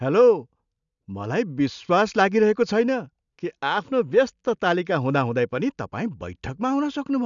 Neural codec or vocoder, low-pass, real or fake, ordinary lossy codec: none; 7.2 kHz; real; none